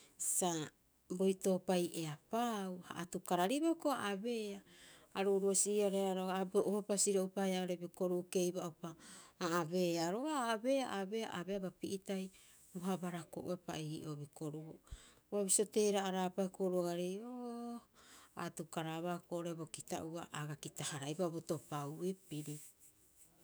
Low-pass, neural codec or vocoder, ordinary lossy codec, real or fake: none; autoencoder, 48 kHz, 128 numbers a frame, DAC-VAE, trained on Japanese speech; none; fake